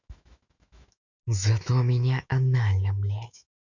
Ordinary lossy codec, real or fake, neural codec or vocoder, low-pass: none; fake; autoencoder, 48 kHz, 128 numbers a frame, DAC-VAE, trained on Japanese speech; 7.2 kHz